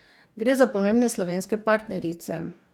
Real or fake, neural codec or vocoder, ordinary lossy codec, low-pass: fake; codec, 44.1 kHz, 2.6 kbps, DAC; none; 19.8 kHz